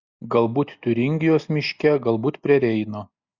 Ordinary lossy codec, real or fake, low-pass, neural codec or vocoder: Opus, 64 kbps; real; 7.2 kHz; none